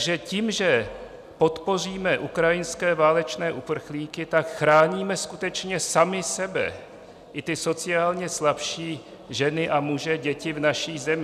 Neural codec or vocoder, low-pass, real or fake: none; 14.4 kHz; real